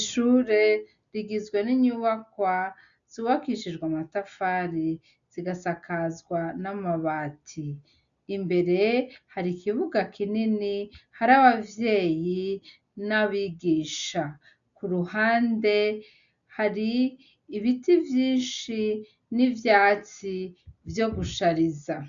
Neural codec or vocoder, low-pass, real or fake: none; 7.2 kHz; real